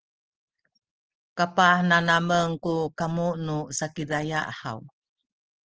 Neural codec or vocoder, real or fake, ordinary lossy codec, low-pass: none; real; Opus, 16 kbps; 7.2 kHz